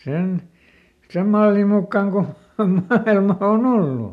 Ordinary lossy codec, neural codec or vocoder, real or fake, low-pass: none; none; real; 14.4 kHz